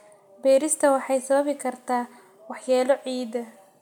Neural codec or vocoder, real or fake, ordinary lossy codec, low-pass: none; real; none; 19.8 kHz